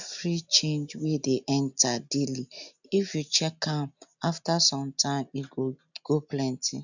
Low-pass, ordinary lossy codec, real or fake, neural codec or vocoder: 7.2 kHz; none; real; none